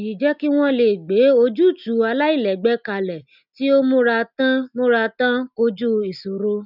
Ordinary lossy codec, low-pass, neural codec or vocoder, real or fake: none; 5.4 kHz; none; real